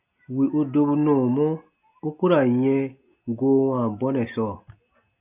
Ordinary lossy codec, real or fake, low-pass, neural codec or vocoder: AAC, 32 kbps; real; 3.6 kHz; none